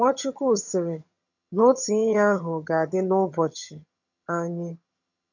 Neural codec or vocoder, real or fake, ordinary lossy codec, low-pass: vocoder, 22.05 kHz, 80 mel bands, HiFi-GAN; fake; none; 7.2 kHz